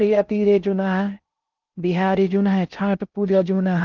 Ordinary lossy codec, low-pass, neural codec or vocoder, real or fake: Opus, 24 kbps; 7.2 kHz; codec, 16 kHz in and 24 kHz out, 0.6 kbps, FocalCodec, streaming, 4096 codes; fake